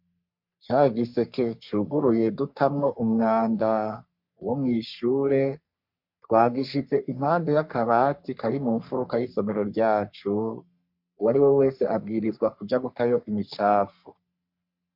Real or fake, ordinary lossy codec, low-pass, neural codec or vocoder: fake; MP3, 48 kbps; 5.4 kHz; codec, 44.1 kHz, 3.4 kbps, Pupu-Codec